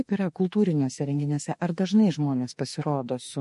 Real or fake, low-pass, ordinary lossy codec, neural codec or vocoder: fake; 14.4 kHz; MP3, 48 kbps; autoencoder, 48 kHz, 32 numbers a frame, DAC-VAE, trained on Japanese speech